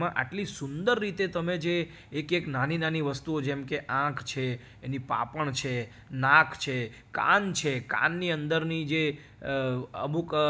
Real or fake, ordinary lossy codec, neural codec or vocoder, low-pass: real; none; none; none